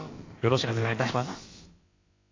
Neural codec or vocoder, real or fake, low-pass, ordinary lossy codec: codec, 16 kHz, about 1 kbps, DyCAST, with the encoder's durations; fake; 7.2 kHz; AAC, 32 kbps